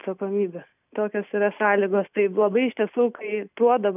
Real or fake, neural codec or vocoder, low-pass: real; none; 3.6 kHz